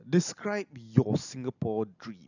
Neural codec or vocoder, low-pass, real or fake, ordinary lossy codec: none; 7.2 kHz; real; none